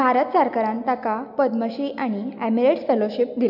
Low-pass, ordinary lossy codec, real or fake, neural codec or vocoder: 5.4 kHz; none; real; none